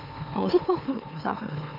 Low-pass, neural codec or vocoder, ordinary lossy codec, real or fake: 5.4 kHz; autoencoder, 44.1 kHz, a latent of 192 numbers a frame, MeloTTS; none; fake